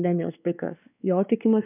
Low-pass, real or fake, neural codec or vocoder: 3.6 kHz; fake; codec, 16 kHz, 2 kbps, X-Codec, WavLM features, trained on Multilingual LibriSpeech